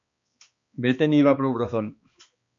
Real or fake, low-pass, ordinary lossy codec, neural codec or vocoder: fake; 7.2 kHz; MP3, 48 kbps; codec, 16 kHz, 2 kbps, X-Codec, HuBERT features, trained on balanced general audio